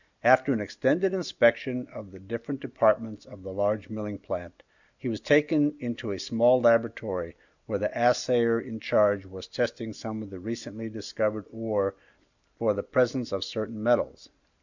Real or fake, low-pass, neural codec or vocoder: real; 7.2 kHz; none